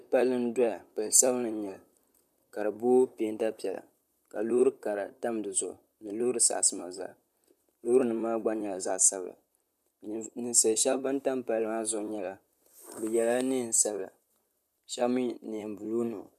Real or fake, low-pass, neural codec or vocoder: fake; 14.4 kHz; vocoder, 44.1 kHz, 128 mel bands, Pupu-Vocoder